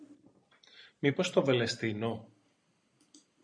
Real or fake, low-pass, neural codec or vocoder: real; 9.9 kHz; none